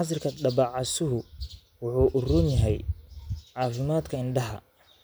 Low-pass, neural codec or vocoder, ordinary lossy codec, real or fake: none; none; none; real